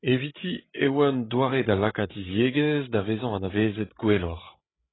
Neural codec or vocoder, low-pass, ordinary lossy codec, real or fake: codec, 16 kHz, 16 kbps, FreqCodec, larger model; 7.2 kHz; AAC, 16 kbps; fake